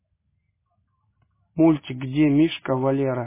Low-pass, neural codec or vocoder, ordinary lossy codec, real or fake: 3.6 kHz; none; MP3, 16 kbps; real